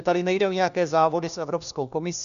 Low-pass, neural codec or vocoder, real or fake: 7.2 kHz; codec, 16 kHz, 1 kbps, FunCodec, trained on LibriTTS, 50 frames a second; fake